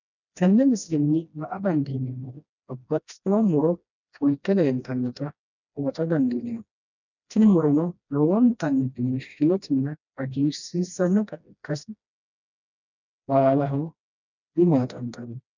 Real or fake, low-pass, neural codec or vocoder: fake; 7.2 kHz; codec, 16 kHz, 1 kbps, FreqCodec, smaller model